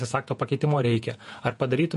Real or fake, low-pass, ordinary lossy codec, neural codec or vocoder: fake; 14.4 kHz; MP3, 48 kbps; vocoder, 48 kHz, 128 mel bands, Vocos